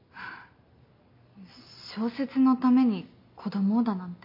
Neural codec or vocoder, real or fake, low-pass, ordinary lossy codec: none; real; 5.4 kHz; MP3, 32 kbps